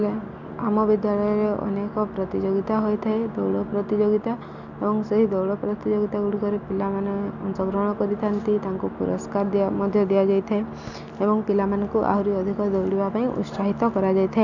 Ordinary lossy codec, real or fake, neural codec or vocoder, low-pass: none; real; none; 7.2 kHz